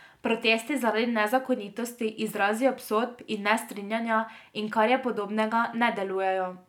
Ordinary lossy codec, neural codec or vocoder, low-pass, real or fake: none; none; 19.8 kHz; real